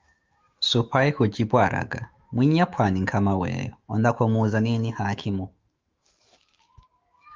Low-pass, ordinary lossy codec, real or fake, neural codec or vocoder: 7.2 kHz; Opus, 32 kbps; fake; autoencoder, 48 kHz, 128 numbers a frame, DAC-VAE, trained on Japanese speech